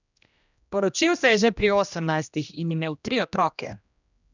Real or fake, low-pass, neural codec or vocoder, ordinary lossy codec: fake; 7.2 kHz; codec, 16 kHz, 1 kbps, X-Codec, HuBERT features, trained on general audio; none